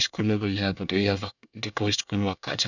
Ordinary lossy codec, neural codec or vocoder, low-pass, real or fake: none; codec, 24 kHz, 1 kbps, SNAC; 7.2 kHz; fake